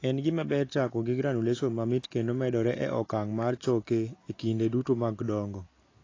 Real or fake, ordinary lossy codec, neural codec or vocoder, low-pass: real; AAC, 32 kbps; none; 7.2 kHz